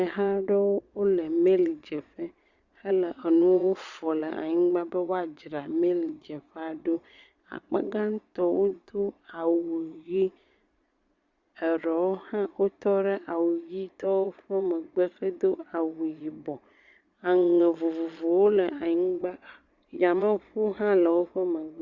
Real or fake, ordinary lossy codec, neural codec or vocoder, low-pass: fake; Opus, 64 kbps; vocoder, 22.05 kHz, 80 mel bands, Vocos; 7.2 kHz